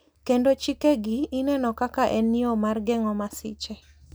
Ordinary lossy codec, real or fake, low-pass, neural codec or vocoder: none; real; none; none